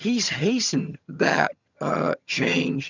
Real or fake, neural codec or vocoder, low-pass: fake; vocoder, 22.05 kHz, 80 mel bands, HiFi-GAN; 7.2 kHz